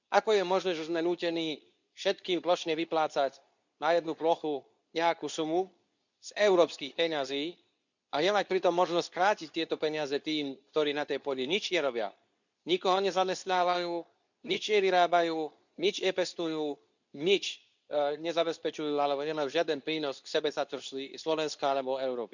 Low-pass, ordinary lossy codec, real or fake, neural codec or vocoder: 7.2 kHz; none; fake; codec, 24 kHz, 0.9 kbps, WavTokenizer, medium speech release version 2